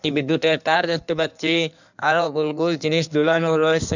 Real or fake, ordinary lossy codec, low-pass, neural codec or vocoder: fake; none; 7.2 kHz; codec, 16 kHz in and 24 kHz out, 1.1 kbps, FireRedTTS-2 codec